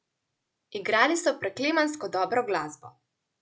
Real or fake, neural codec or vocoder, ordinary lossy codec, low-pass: real; none; none; none